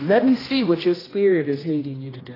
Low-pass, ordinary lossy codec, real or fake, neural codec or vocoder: 5.4 kHz; AAC, 24 kbps; fake; codec, 16 kHz, 1 kbps, X-Codec, HuBERT features, trained on general audio